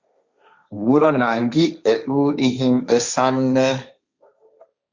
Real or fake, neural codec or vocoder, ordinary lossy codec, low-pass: fake; codec, 16 kHz, 1.1 kbps, Voila-Tokenizer; Opus, 64 kbps; 7.2 kHz